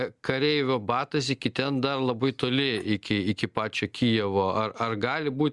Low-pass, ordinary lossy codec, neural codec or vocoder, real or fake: 10.8 kHz; Opus, 64 kbps; none; real